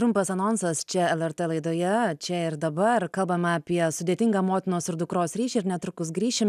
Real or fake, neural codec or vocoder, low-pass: real; none; 14.4 kHz